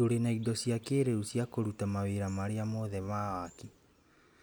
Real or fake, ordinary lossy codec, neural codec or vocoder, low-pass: real; none; none; none